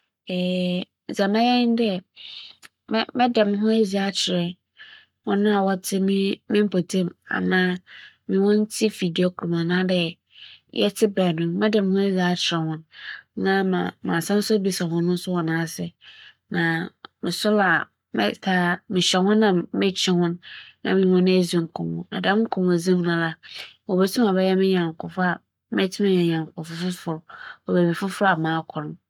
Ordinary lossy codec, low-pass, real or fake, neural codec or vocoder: none; 19.8 kHz; fake; codec, 44.1 kHz, 7.8 kbps, Pupu-Codec